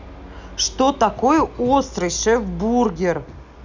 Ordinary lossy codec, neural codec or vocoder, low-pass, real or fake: none; none; 7.2 kHz; real